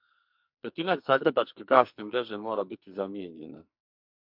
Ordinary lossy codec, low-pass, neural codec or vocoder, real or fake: MP3, 48 kbps; 5.4 kHz; codec, 44.1 kHz, 2.6 kbps, SNAC; fake